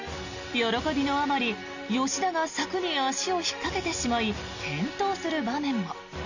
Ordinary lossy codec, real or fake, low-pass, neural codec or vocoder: none; real; 7.2 kHz; none